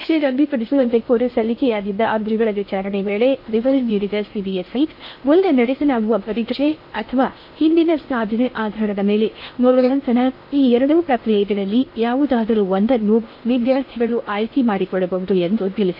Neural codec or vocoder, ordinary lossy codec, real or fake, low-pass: codec, 16 kHz in and 24 kHz out, 0.6 kbps, FocalCodec, streaming, 2048 codes; MP3, 32 kbps; fake; 5.4 kHz